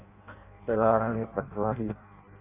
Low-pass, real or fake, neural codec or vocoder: 3.6 kHz; fake; codec, 16 kHz in and 24 kHz out, 0.6 kbps, FireRedTTS-2 codec